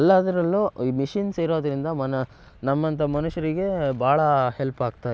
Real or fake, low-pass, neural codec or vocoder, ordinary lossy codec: real; none; none; none